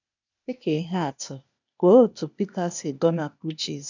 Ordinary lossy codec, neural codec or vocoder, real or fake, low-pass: none; codec, 16 kHz, 0.8 kbps, ZipCodec; fake; 7.2 kHz